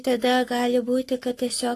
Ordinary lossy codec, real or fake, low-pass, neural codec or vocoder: AAC, 48 kbps; fake; 14.4 kHz; codec, 44.1 kHz, 7.8 kbps, Pupu-Codec